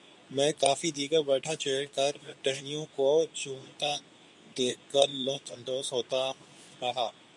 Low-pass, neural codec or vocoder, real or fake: 10.8 kHz; codec, 24 kHz, 0.9 kbps, WavTokenizer, medium speech release version 2; fake